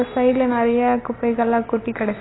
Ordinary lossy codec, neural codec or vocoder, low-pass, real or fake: AAC, 16 kbps; none; 7.2 kHz; real